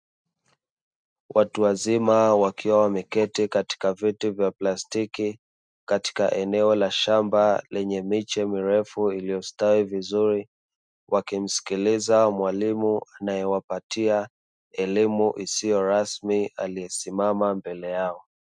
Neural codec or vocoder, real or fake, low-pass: none; real; 9.9 kHz